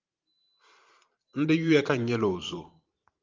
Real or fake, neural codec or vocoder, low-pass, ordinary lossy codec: fake; vocoder, 44.1 kHz, 128 mel bands every 512 samples, BigVGAN v2; 7.2 kHz; Opus, 32 kbps